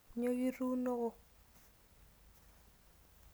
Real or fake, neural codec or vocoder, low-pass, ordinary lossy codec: real; none; none; none